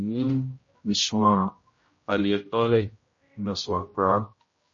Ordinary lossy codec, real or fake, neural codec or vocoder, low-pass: MP3, 32 kbps; fake; codec, 16 kHz, 0.5 kbps, X-Codec, HuBERT features, trained on general audio; 7.2 kHz